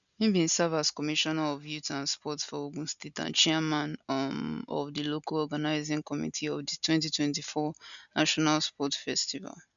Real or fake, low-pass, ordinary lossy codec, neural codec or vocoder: real; 7.2 kHz; none; none